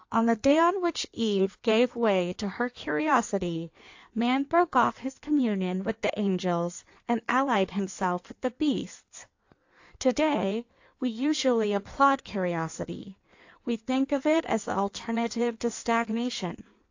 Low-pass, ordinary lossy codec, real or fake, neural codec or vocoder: 7.2 kHz; AAC, 48 kbps; fake; codec, 16 kHz in and 24 kHz out, 1.1 kbps, FireRedTTS-2 codec